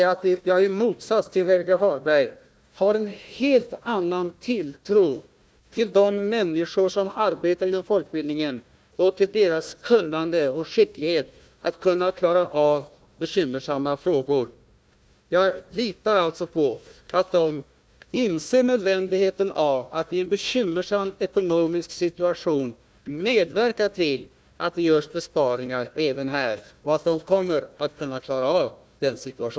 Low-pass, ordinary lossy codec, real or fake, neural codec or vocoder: none; none; fake; codec, 16 kHz, 1 kbps, FunCodec, trained on Chinese and English, 50 frames a second